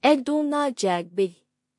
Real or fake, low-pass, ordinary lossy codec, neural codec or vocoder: fake; 10.8 kHz; MP3, 48 kbps; codec, 16 kHz in and 24 kHz out, 0.4 kbps, LongCat-Audio-Codec, two codebook decoder